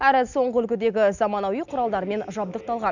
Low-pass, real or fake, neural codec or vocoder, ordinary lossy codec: 7.2 kHz; real; none; none